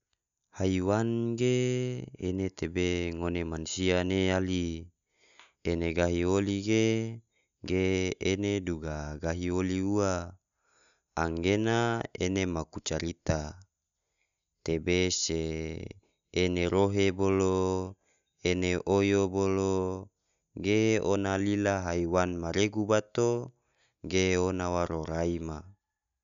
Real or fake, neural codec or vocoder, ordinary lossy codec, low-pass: real; none; none; 7.2 kHz